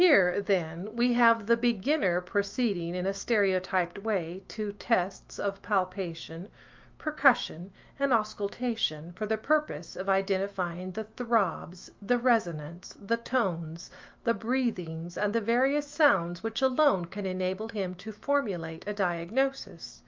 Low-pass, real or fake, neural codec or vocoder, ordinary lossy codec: 7.2 kHz; fake; autoencoder, 48 kHz, 128 numbers a frame, DAC-VAE, trained on Japanese speech; Opus, 24 kbps